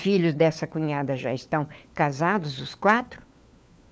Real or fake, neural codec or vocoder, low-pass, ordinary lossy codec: fake; codec, 16 kHz, 4 kbps, FunCodec, trained on LibriTTS, 50 frames a second; none; none